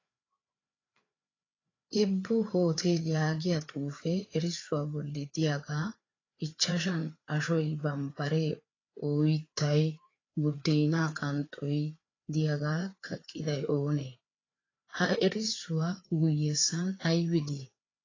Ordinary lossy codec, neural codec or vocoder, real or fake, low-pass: AAC, 32 kbps; codec, 16 kHz, 4 kbps, FreqCodec, larger model; fake; 7.2 kHz